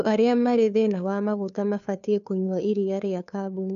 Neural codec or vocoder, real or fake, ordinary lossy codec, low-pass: codec, 16 kHz, 2 kbps, FunCodec, trained on Chinese and English, 25 frames a second; fake; MP3, 96 kbps; 7.2 kHz